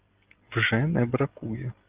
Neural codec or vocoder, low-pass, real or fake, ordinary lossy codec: none; 3.6 kHz; real; Opus, 32 kbps